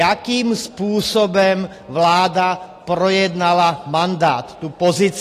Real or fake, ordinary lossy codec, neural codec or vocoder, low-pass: real; AAC, 48 kbps; none; 14.4 kHz